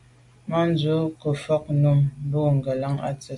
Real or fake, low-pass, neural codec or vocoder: real; 10.8 kHz; none